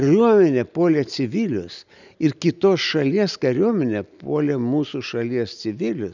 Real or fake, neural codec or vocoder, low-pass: real; none; 7.2 kHz